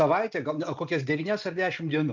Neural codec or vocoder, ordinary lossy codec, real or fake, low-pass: none; MP3, 64 kbps; real; 7.2 kHz